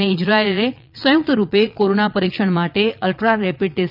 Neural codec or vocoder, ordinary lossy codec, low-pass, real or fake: vocoder, 22.05 kHz, 80 mel bands, Vocos; none; 5.4 kHz; fake